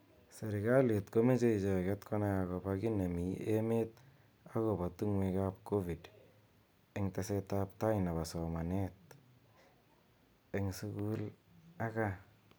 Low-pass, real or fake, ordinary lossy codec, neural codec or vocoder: none; real; none; none